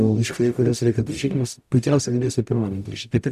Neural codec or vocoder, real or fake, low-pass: codec, 44.1 kHz, 0.9 kbps, DAC; fake; 14.4 kHz